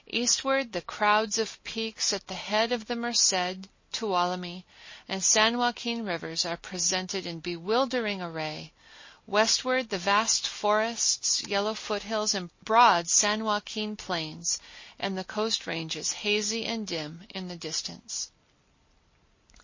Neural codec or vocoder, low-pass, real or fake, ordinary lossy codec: none; 7.2 kHz; real; MP3, 32 kbps